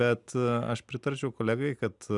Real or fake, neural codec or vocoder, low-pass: real; none; 10.8 kHz